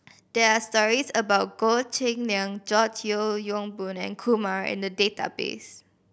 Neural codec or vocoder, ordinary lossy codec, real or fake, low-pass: none; none; real; none